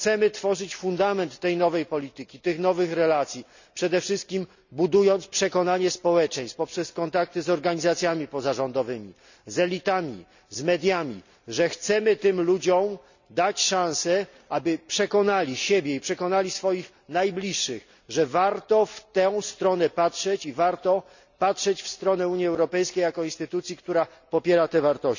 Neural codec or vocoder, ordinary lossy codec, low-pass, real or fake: none; none; 7.2 kHz; real